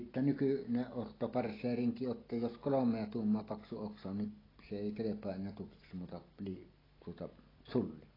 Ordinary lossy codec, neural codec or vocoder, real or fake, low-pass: AAC, 24 kbps; none; real; 5.4 kHz